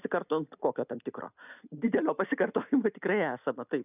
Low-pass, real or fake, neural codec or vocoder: 3.6 kHz; real; none